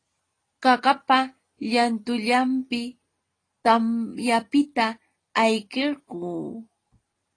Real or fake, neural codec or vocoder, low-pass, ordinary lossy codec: real; none; 9.9 kHz; AAC, 32 kbps